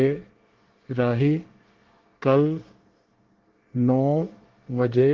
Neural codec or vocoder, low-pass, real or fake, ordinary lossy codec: codec, 24 kHz, 1 kbps, SNAC; 7.2 kHz; fake; Opus, 16 kbps